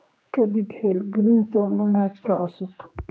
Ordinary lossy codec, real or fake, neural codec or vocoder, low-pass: none; fake; codec, 16 kHz, 2 kbps, X-Codec, HuBERT features, trained on general audio; none